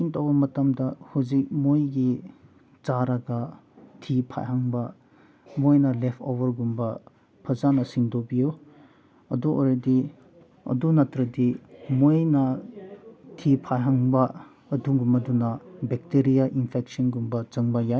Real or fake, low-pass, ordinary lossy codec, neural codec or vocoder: real; none; none; none